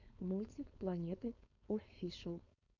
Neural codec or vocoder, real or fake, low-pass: codec, 16 kHz, 4.8 kbps, FACodec; fake; 7.2 kHz